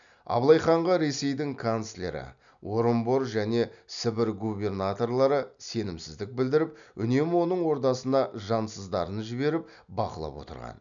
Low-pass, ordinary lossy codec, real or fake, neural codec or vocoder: 7.2 kHz; none; real; none